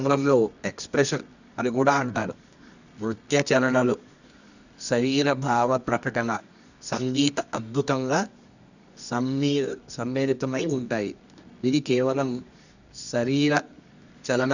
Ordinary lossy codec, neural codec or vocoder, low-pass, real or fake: none; codec, 24 kHz, 0.9 kbps, WavTokenizer, medium music audio release; 7.2 kHz; fake